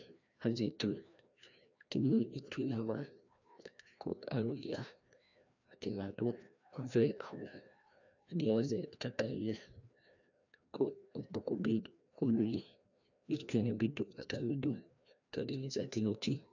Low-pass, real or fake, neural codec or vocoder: 7.2 kHz; fake; codec, 16 kHz, 1 kbps, FreqCodec, larger model